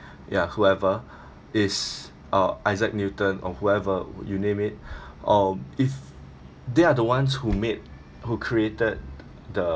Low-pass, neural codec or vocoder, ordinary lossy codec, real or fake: none; none; none; real